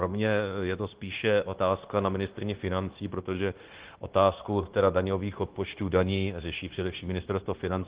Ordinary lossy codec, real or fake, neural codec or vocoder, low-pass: Opus, 16 kbps; fake; codec, 16 kHz, 0.9 kbps, LongCat-Audio-Codec; 3.6 kHz